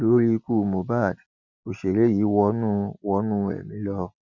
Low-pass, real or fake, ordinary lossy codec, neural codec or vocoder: 7.2 kHz; real; Opus, 64 kbps; none